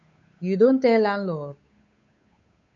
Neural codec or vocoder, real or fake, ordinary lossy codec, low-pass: codec, 16 kHz, 8 kbps, FunCodec, trained on Chinese and English, 25 frames a second; fake; MP3, 64 kbps; 7.2 kHz